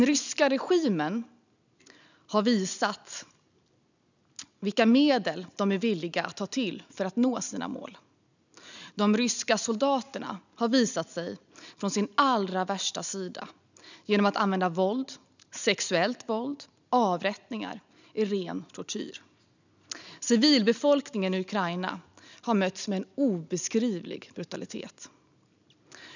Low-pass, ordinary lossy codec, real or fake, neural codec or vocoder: 7.2 kHz; none; real; none